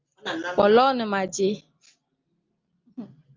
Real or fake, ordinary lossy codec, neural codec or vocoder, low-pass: real; Opus, 24 kbps; none; 7.2 kHz